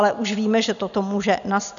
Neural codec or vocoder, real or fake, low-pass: none; real; 7.2 kHz